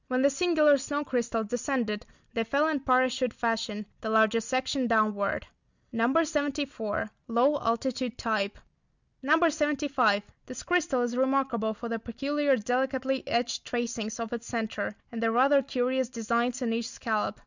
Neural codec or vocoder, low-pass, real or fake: none; 7.2 kHz; real